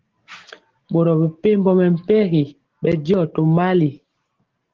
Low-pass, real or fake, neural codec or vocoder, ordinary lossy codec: 7.2 kHz; real; none; Opus, 16 kbps